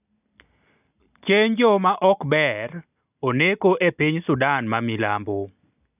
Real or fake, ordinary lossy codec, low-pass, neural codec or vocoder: real; none; 3.6 kHz; none